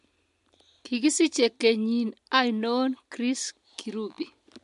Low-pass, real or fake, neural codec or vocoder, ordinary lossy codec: 10.8 kHz; real; none; MP3, 64 kbps